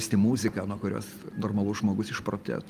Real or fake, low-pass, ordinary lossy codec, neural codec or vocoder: real; 14.4 kHz; Opus, 32 kbps; none